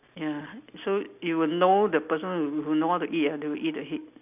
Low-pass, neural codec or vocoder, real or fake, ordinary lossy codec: 3.6 kHz; none; real; none